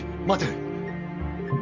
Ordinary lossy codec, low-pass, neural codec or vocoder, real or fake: none; 7.2 kHz; none; real